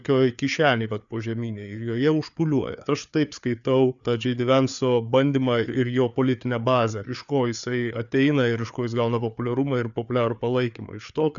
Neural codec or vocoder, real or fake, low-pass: codec, 16 kHz, 4 kbps, FreqCodec, larger model; fake; 7.2 kHz